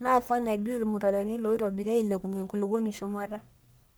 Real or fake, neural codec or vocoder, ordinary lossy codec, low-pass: fake; codec, 44.1 kHz, 1.7 kbps, Pupu-Codec; none; none